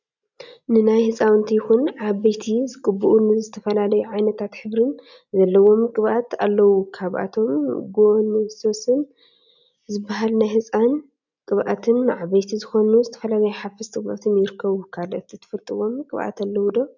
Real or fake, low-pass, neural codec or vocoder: real; 7.2 kHz; none